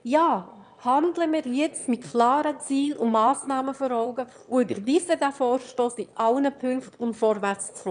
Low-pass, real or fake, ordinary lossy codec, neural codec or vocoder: 9.9 kHz; fake; none; autoencoder, 22.05 kHz, a latent of 192 numbers a frame, VITS, trained on one speaker